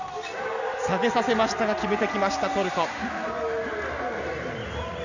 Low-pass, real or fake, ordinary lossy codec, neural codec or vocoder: 7.2 kHz; real; none; none